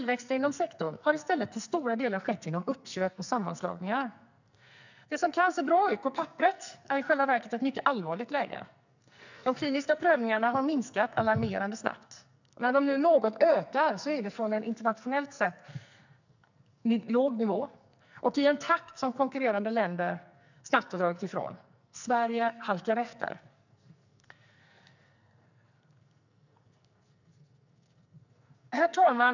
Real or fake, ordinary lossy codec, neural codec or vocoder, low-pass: fake; none; codec, 44.1 kHz, 2.6 kbps, SNAC; 7.2 kHz